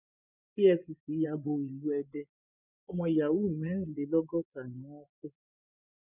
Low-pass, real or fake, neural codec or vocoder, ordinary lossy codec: 3.6 kHz; fake; vocoder, 24 kHz, 100 mel bands, Vocos; none